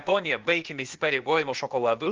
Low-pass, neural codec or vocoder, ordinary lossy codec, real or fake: 7.2 kHz; codec, 16 kHz, 0.8 kbps, ZipCodec; Opus, 24 kbps; fake